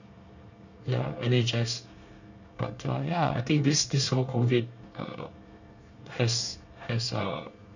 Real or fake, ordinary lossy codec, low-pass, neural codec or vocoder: fake; none; 7.2 kHz; codec, 24 kHz, 1 kbps, SNAC